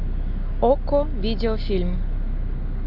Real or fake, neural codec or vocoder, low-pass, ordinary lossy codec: real; none; 5.4 kHz; AAC, 48 kbps